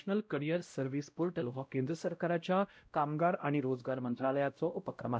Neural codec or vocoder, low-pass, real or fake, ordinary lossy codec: codec, 16 kHz, 0.5 kbps, X-Codec, WavLM features, trained on Multilingual LibriSpeech; none; fake; none